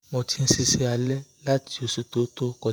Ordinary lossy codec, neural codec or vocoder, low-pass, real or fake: none; vocoder, 48 kHz, 128 mel bands, Vocos; none; fake